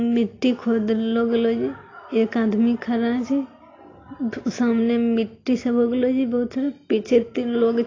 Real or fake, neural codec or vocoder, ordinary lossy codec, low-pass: real; none; AAC, 32 kbps; 7.2 kHz